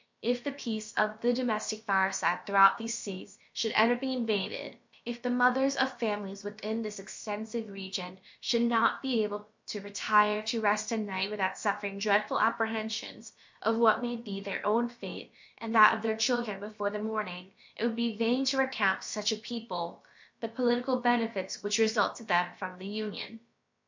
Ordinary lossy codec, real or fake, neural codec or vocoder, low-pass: MP3, 48 kbps; fake; codec, 16 kHz, about 1 kbps, DyCAST, with the encoder's durations; 7.2 kHz